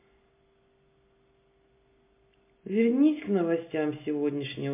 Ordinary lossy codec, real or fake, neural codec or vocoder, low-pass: MP3, 24 kbps; real; none; 3.6 kHz